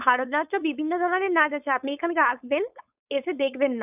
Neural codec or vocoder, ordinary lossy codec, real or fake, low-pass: codec, 16 kHz, 4.8 kbps, FACodec; none; fake; 3.6 kHz